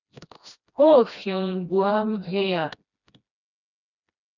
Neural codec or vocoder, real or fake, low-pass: codec, 16 kHz, 1 kbps, FreqCodec, smaller model; fake; 7.2 kHz